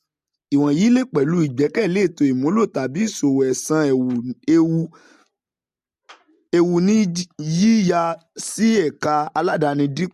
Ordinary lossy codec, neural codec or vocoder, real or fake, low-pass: MP3, 64 kbps; none; real; 14.4 kHz